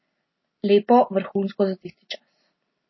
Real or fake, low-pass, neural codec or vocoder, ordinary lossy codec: real; 7.2 kHz; none; MP3, 24 kbps